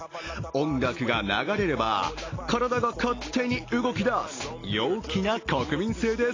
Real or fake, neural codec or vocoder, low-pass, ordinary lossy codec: real; none; 7.2 kHz; AAC, 32 kbps